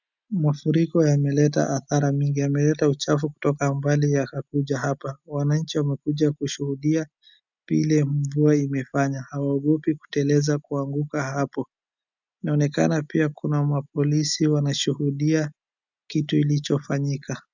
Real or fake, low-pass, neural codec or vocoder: real; 7.2 kHz; none